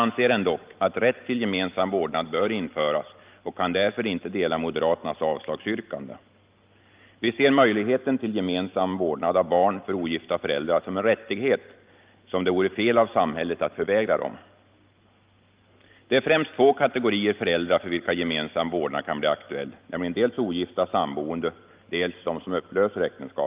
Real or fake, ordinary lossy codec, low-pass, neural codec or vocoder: real; Opus, 32 kbps; 3.6 kHz; none